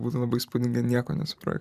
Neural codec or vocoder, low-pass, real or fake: vocoder, 44.1 kHz, 128 mel bands every 512 samples, BigVGAN v2; 14.4 kHz; fake